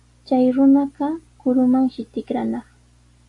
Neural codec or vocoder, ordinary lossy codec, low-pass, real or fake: none; AAC, 48 kbps; 10.8 kHz; real